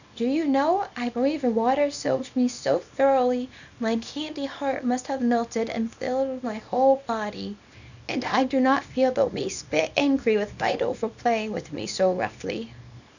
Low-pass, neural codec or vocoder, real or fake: 7.2 kHz; codec, 24 kHz, 0.9 kbps, WavTokenizer, small release; fake